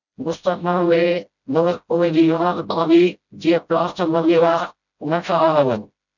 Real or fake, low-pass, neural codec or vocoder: fake; 7.2 kHz; codec, 16 kHz, 0.5 kbps, FreqCodec, smaller model